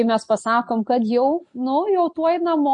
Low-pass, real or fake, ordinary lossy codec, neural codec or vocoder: 9.9 kHz; real; MP3, 48 kbps; none